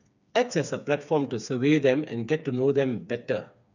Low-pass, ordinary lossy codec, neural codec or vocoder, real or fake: 7.2 kHz; none; codec, 16 kHz, 4 kbps, FreqCodec, smaller model; fake